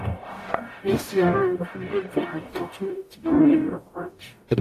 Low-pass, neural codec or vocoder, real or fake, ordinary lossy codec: 14.4 kHz; codec, 44.1 kHz, 0.9 kbps, DAC; fake; AAC, 64 kbps